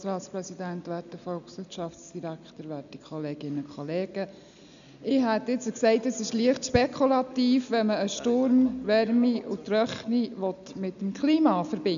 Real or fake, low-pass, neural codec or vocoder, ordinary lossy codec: real; 7.2 kHz; none; none